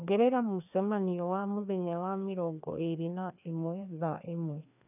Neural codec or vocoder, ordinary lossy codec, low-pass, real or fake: codec, 16 kHz, 2 kbps, FreqCodec, larger model; none; 3.6 kHz; fake